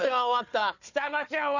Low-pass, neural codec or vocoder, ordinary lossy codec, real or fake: 7.2 kHz; codec, 16 kHz, 4 kbps, FunCodec, trained on Chinese and English, 50 frames a second; none; fake